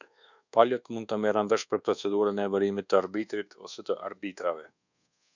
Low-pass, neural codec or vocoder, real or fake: 7.2 kHz; codec, 24 kHz, 1.2 kbps, DualCodec; fake